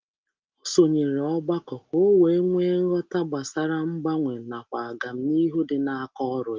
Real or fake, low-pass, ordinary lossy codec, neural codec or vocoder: real; 7.2 kHz; Opus, 32 kbps; none